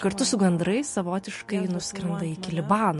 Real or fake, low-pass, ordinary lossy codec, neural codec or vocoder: real; 14.4 kHz; MP3, 48 kbps; none